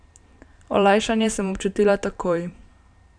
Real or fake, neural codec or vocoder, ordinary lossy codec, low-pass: fake; vocoder, 44.1 kHz, 128 mel bands every 512 samples, BigVGAN v2; none; 9.9 kHz